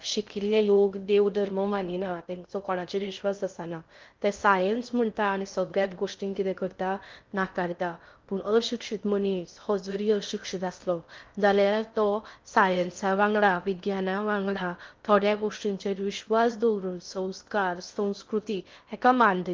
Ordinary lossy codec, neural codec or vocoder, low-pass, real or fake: Opus, 32 kbps; codec, 16 kHz in and 24 kHz out, 0.6 kbps, FocalCodec, streaming, 2048 codes; 7.2 kHz; fake